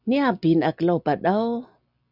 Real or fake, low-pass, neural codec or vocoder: real; 5.4 kHz; none